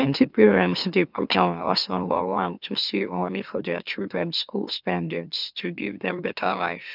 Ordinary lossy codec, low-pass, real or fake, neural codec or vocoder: none; 5.4 kHz; fake; autoencoder, 44.1 kHz, a latent of 192 numbers a frame, MeloTTS